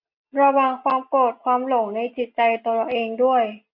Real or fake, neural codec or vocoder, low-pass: real; none; 5.4 kHz